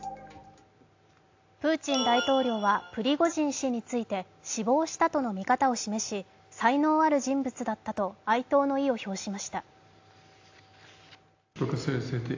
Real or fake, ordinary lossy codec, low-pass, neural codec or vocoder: real; AAC, 48 kbps; 7.2 kHz; none